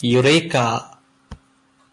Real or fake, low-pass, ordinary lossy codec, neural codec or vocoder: real; 10.8 kHz; AAC, 64 kbps; none